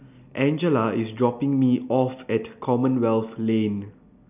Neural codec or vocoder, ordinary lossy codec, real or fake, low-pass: none; none; real; 3.6 kHz